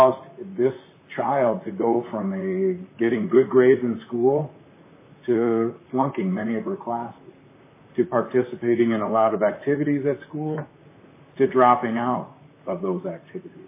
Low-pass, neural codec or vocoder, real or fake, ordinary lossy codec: 3.6 kHz; vocoder, 44.1 kHz, 128 mel bands, Pupu-Vocoder; fake; MP3, 16 kbps